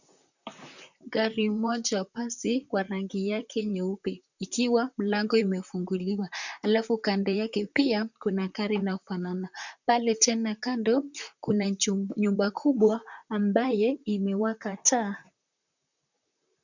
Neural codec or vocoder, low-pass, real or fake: vocoder, 44.1 kHz, 128 mel bands, Pupu-Vocoder; 7.2 kHz; fake